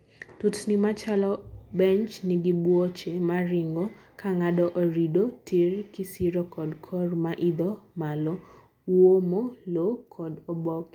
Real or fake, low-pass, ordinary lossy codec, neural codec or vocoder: real; 19.8 kHz; Opus, 32 kbps; none